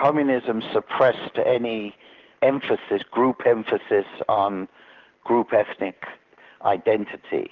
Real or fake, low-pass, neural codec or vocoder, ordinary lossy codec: real; 7.2 kHz; none; Opus, 16 kbps